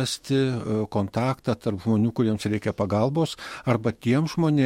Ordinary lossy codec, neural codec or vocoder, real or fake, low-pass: MP3, 64 kbps; autoencoder, 48 kHz, 128 numbers a frame, DAC-VAE, trained on Japanese speech; fake; 19.8 kHz